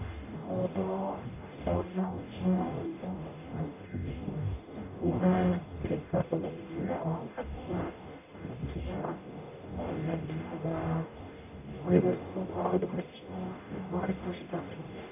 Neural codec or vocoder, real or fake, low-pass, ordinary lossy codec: codec, 44.1 kHz, 0.9 kbps, DAC; fake; 3.6 kHz; none